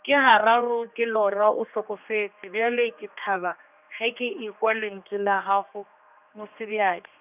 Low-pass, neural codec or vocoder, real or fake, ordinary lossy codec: 3.6 kHz; codec, 16 kHz, 2 kbps, X-Codec, HuBERT features, trained on general audio; fake; none